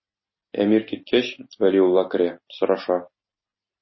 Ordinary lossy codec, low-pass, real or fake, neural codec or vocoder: MP3, 24 kbps; 7.2 kHz; real; none